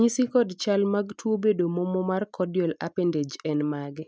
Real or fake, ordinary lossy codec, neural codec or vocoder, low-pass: real; none; none; none